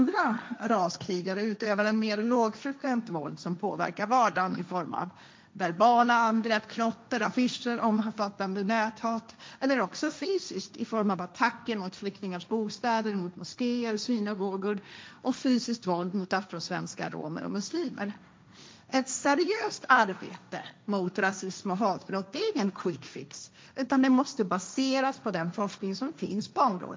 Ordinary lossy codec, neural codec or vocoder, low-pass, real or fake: none; codec, 16 kHz, 1.1 kbps, Voila-Tokenizer; none; fake